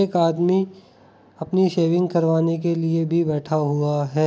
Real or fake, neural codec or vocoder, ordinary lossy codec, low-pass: real; none; none; none